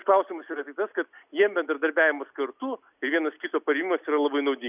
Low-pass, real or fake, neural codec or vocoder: 3.6 kHz; real; none